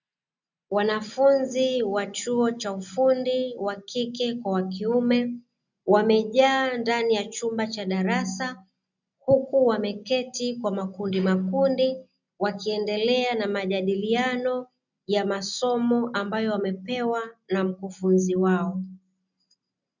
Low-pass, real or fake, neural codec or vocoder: 7.2 kHz; real; none